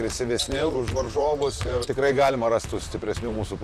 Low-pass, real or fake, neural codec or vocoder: 14.4 kHz; fake; vocoder, 44.1 kHz, 128 mel bands, Pupu-Vocoder